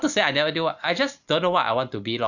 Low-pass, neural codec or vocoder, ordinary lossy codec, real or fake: 7.2 kHz; none; none; real